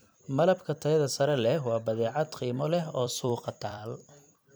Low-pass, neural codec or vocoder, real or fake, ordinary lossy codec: none; vocoder, 44.1 kHz, 128 mel bands every 256 samples, BigVGAN v2; fake; none